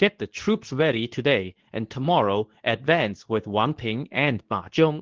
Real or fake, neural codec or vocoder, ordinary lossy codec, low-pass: fake; codec, 24 kHz, 0.9 kbps, WavTokenizer, medium speech release version 2; Opus, 16 kbps; 7.2 kHz